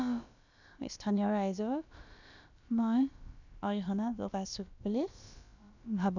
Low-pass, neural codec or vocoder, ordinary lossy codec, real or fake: 7.2 kHz; codec, 16 kHz, about 1 kbps, DyCAST, with the encoder's durations; none; fake